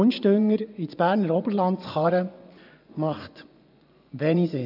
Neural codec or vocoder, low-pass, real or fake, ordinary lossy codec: vocoder, 24 kHz, 100 mel bands, Vocos; 5.4 kHz; fake; none